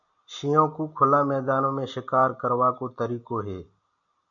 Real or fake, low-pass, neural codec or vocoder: real; 7.2 kHz; none